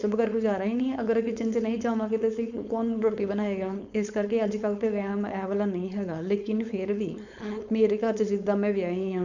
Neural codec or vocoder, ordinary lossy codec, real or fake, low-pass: codec, 16 kHz, 4.8 kbps, FACodec; none; fake; 7.2 kHz